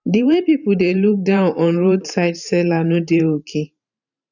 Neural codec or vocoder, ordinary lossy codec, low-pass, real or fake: vocoder, 44.1 kHz, 128 mel bands every 512 samples, BigVGAN v2; none; 7.2 kHz; fake